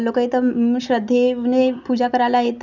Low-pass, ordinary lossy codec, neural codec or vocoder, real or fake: 7.2 kHz; none; none; real